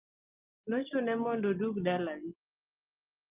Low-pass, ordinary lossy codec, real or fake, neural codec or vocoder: 3.6 kHz; Opus, 32 kbps; real; none